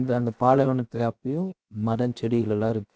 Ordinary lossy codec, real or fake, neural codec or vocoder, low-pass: none; fake; codec, 16 kHz, 0.7 kbps, FocalCodec; none